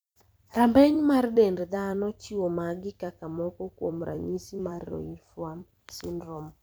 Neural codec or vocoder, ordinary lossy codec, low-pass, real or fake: vocoder, 44.1 kHz, 128 mel bands every 256 samples, BigVGAN v2; none; none; fake